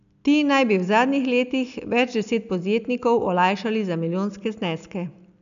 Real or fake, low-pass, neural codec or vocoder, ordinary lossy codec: real; 7.2 kHz; none; none